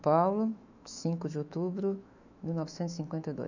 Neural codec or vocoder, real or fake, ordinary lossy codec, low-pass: autoencoder, 48 kHz, 128 numbers a frame, DAC-VAE, trained on Japanese speech; fake; none; 7.2 kHz